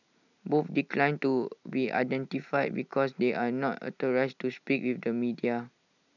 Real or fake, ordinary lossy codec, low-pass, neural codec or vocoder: real; none; 7.2 kHz; none